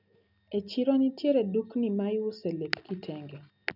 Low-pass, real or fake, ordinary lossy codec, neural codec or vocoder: 5.4 kHz; real; none; none